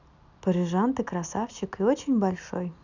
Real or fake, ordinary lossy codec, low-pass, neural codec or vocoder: real; none; 7.2 kHz; none